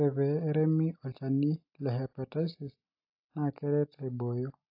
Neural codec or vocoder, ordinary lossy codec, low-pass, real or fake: none; AAC, 32 kbps; 5.4 kHz; real